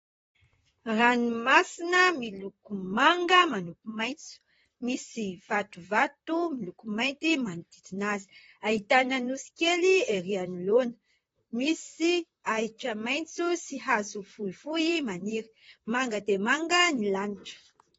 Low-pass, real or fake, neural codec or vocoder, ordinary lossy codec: 19.8 kHz; fake; vocoder, 44.1 kHz, 128 mel bands, Pupu-Vocoder; AAC, 24 kbps